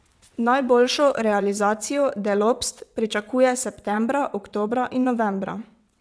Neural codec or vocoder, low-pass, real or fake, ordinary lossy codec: vocoder, 22.05 kHz, 80 mel bands, WaveNeXt; none; fake; none